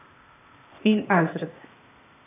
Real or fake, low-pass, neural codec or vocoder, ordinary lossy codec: fake; 3.6 kHz; codec, 16 kHz, 0.8 kbps, ZipCodec; AAC, 24 kbps